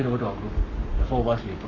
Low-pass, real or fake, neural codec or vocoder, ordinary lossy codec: 7.2 kHz; fake; codec, 44.1 kHz, 7.8 kbps, Pupu-Codec; none